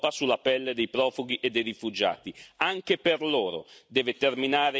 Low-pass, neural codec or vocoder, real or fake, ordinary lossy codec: none; none; real; none